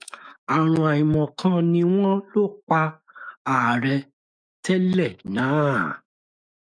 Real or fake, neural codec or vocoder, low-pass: fake; autoencoder, 48 kHz, 128 numbers a frame, DAC-VAE, trained on Japanese speech; 9.9 kHz